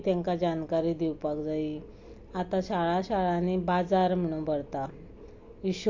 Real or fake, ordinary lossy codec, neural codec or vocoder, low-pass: real; MP3, 48 kbps; none; 7.2 kHz